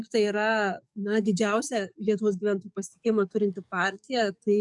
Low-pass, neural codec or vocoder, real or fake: 10.8 kHz; codec, 44.1 kHz, 7.8 kbps, DAC; fake